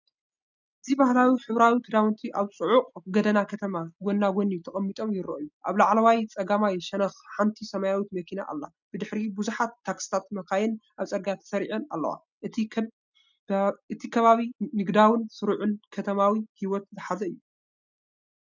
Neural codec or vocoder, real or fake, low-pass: none; real; 7.2 kHz